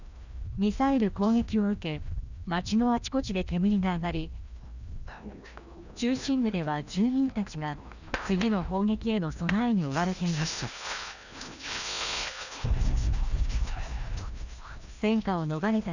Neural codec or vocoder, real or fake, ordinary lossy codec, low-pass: codec, 16 kHz, 1 kbps, FreqCodec, larger model; fake; none; 7.2 kHz